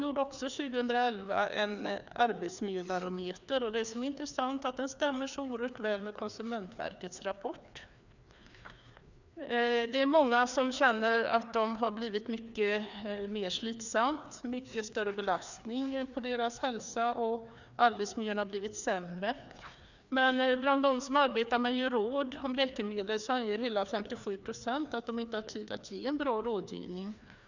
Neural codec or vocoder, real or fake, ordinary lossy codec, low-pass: codec, 16 kHz, 2 kbps, FreqCodec, larger model; fake; none; 7.2 kHz